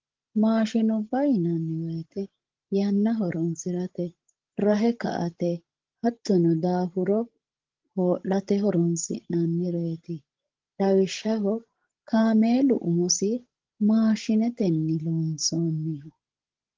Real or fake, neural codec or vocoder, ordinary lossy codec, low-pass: fake; codec, 16 kHz, 16 kbps, FreqCodec, larger model; Opus, 16 kbps; 7.2 kHz